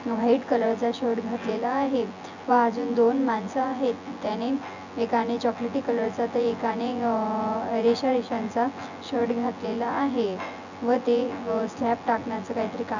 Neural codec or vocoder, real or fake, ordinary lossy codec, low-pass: vocoder, 24 kHz, 100 mel bands, Vocos; fake; none; 7.2 kHz